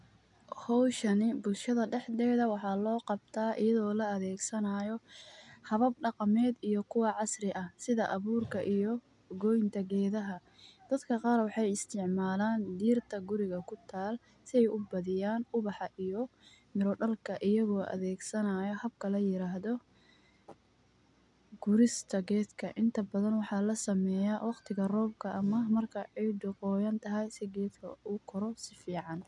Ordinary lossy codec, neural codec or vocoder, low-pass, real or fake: none; none; 10.8 kHz; real